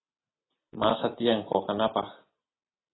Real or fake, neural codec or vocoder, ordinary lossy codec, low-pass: real; none; AAC, 16 kbps; 7.2 kHz